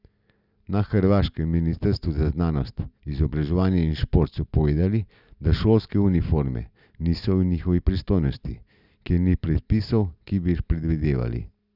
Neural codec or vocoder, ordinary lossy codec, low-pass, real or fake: none; none; 5.4 kHz; real